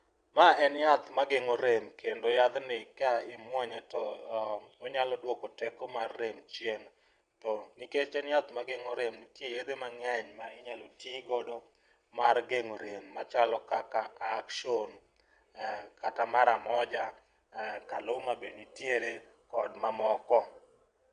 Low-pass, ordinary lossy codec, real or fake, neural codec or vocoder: 9.9 kHz; none; fake; vocoder, 22.05 kHz, 80 mel bands, WaveNeXt